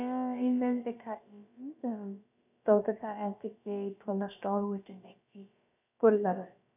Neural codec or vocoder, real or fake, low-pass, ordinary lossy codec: codec, 16 kHz, about 1 kbps, DyCAST, with the encoder's durations; fake; 3.6 kHz; none